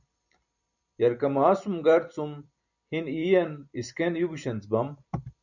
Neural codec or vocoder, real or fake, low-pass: vocoder, 44.1 kHz, 128 mel bands every 256 samples, BigVGAN v2; fake; 7.2 kHz